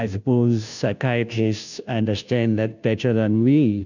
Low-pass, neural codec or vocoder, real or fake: 7.2 kHz; codec, 16 kHz, 0.5 kbps, FunCodec, trained on Chinese and English, 25 frames a second; fake